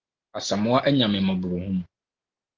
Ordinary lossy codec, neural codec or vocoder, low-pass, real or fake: Opus, 16 kbps; none; 7.2 kHz; real